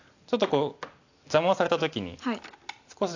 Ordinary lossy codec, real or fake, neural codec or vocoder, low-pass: AAC, 48 kbps; real; none; 7.2 kHz